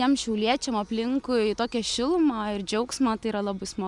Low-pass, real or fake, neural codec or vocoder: 10.8 kHz; real; none